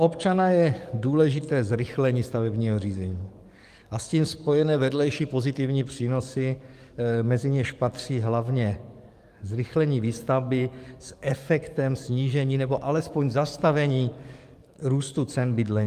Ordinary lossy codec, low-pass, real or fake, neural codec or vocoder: Opus, 32 kbps; 14.4 kHz; fake; codec, 44.1 kHz, 7.8 kbps, DAC